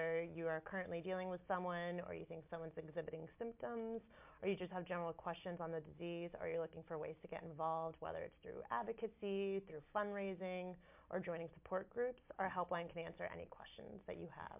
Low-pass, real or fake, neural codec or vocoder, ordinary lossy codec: 3.6 kHz; real; none; MP3, 32 kbps